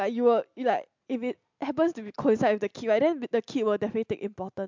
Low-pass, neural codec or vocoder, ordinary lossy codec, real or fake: 7.2 kHz; none; MP3, 64 kbps; real